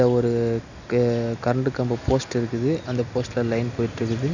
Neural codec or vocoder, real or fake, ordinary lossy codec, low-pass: none; real; none; 7.2 kHz